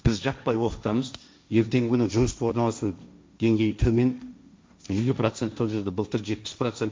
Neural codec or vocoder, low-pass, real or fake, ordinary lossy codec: codec, 16 kHz, 1.1 kbps, Voila-Tokenizer; none; fake; none